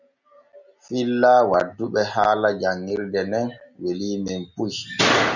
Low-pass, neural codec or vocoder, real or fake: 7.2 kHz; none; real